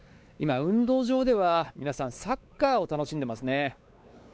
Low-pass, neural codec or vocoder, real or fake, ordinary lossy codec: none; codec, 16 kHz, 4 kbps, X-Codec, WavLM features, trained on Multilingual LibriSpeech; fake; none